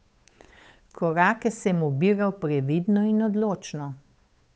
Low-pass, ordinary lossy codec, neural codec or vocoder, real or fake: none; none; codec, 16 kHz, 8 kbps, FunCodec, trained on Chinese and English, 25 frames a second; fake